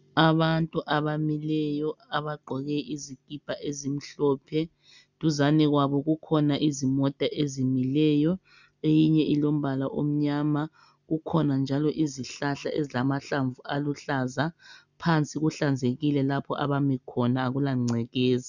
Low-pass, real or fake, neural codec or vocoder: 7.2 kHz; real; none